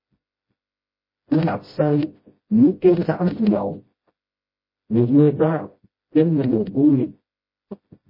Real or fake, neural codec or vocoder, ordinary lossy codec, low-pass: fake; codec, 16 kHz, 0.5 kbps, FreqCodec, smaller model; MP3, 24 kbps; 5.4 kHz